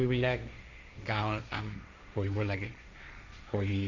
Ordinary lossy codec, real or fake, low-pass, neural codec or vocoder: none; fake; 7.2 kHz; codec, 16 kHz, 1.1 kbps, Voila-Tokenizer